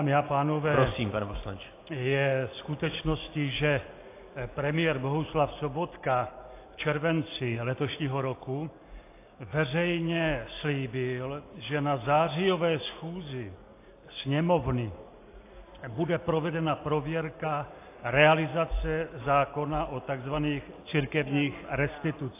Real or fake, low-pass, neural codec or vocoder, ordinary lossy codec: real; 3.6 kHz; none; AAC, 24 kbps